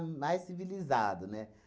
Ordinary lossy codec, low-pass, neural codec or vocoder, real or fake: none; none; none; real